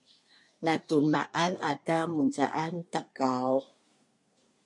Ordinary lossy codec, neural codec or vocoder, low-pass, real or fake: MP3, 64 kbps; codec, 24 kHz, 1 kbps, SNAC; 10.8 kHz; fake